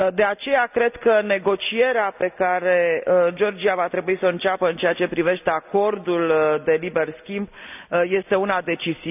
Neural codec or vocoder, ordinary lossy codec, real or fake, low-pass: none; none; real; 3.6 kHz